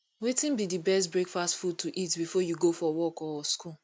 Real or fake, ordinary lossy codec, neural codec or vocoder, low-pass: real; none; none; none